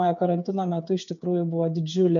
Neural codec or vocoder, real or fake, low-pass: codec, 16 kHz, 8 kbps, FreqCodec, smaller model; fake; 7.2 kHz